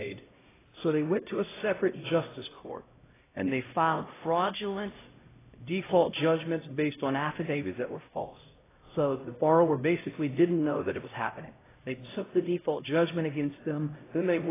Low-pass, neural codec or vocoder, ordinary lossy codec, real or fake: 3.6 kHz; codec, 16 kHz, 0.5 kbps, X-Codec, HuBERT features, trained on LibriSpeech; AAC, 16 kbps; fake